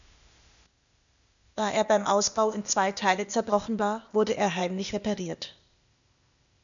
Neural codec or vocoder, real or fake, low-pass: codec, 16 kHz, 0.8 kbps, ZipCodec; fake; 7.2 kHz